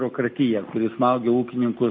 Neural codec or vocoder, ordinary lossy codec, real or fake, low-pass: none; MP3, 32 kbps; real; 7.2 kHz